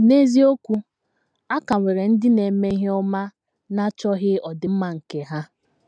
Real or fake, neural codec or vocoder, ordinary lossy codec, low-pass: real; none; none; 9.9 kHz